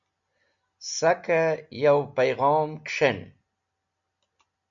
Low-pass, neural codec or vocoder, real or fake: 7.2 kHz; none; real